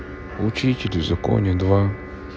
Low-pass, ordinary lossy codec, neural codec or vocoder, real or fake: none; none; none; real